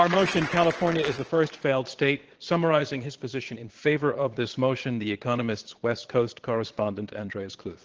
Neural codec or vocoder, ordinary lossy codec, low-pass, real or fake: vocoder, 22.05 kHz, 80 mel bands, Vocos; Opus, 16 kbps; 7.2 kHz; fake